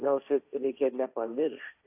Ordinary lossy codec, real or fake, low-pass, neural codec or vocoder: AAC, 24 kbps; fake; 3.6 kHz; codec, 16 kHz, 1.1 kbps, Voila-Tokenizer